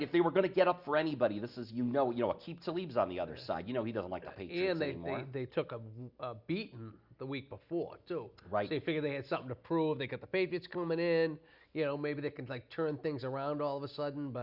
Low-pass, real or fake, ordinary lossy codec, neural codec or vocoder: 5.4 kHz; real; Opus, 64 kbps; none